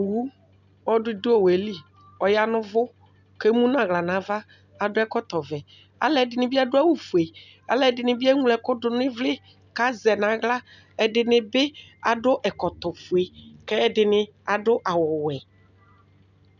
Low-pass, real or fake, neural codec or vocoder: 7.2 kHz; real; none